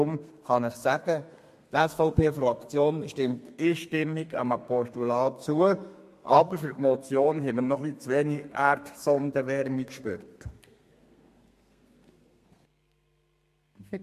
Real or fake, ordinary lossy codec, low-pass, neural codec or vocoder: fake; MP3, 64 kbps; 14.4 kHz; codec, 32 kHz, 1.9 kbps, SNAC